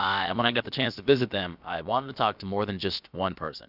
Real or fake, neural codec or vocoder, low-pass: fake; codec, 16 kHz, about 1 kbps, DyCAST, with the encoder's durations; 5.4 kHz